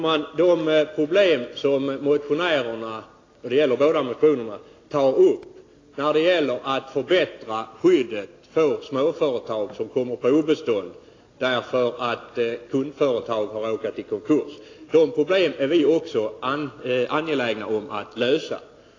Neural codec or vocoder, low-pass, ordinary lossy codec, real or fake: none; 7.2 kHz; AAC, 32 kbps; real